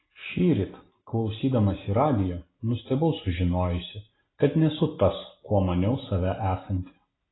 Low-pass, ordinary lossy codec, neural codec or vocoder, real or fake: 7.2 kHz; AAC, 16 kbps; none; real